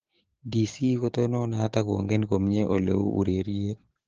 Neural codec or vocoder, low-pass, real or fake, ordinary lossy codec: codec, 16 kHz, 6 kbps, DAC; 7.2 kHz; fake; Opus, 16 kbps